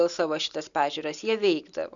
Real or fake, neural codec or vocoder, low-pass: real; none; 7.2 kHz